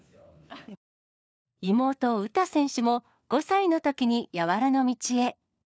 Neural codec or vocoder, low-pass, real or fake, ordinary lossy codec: codec, 16 kHz, 4 kbps, FunCodec, trained on LibriTTS, 50 frames a second; none; fake; none